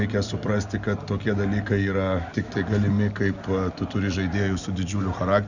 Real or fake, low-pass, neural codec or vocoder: real; 7.2 kHz; none